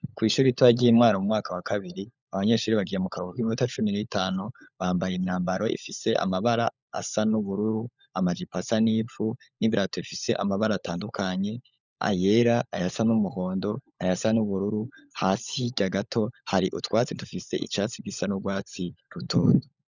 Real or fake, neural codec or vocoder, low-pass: fake; codec, 16 kHz, 16 kbps, FunCodec, trained on LibriTTS, 50 frames a second; 7.2 kHz